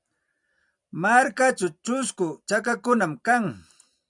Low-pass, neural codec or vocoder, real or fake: 10.8 kHz; vocoder, 44.1 kHz, 128 mel bands every 256 samples, BigVGAN v2; fake